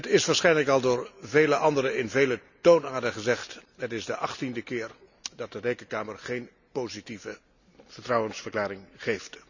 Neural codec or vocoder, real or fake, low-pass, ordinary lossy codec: none; real; 7.2 kHz; none